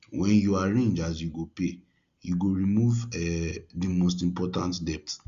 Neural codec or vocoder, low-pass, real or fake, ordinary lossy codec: none; 7.2 kHz; real; Opus, 64 kbps